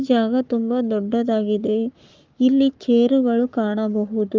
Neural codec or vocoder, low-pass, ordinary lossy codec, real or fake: codec, 44.1 kHz, 7.8 kbps, Pupu-Codec; 7.2 kHz; Opus, 24 kbps; fake